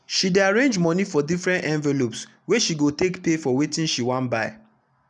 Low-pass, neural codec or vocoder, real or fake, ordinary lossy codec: 10.8 kHz; none; real; none